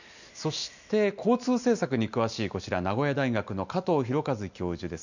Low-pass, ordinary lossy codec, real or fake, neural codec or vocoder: 7.2 kHz; none; real; none